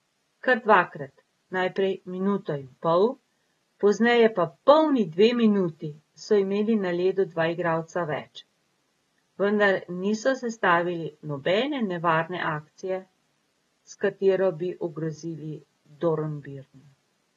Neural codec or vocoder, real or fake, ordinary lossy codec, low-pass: none; real; AAC, 32 kbps; 19.8 kHz